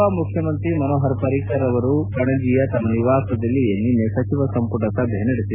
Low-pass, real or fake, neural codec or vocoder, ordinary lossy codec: 3.6 kHz; real; none; none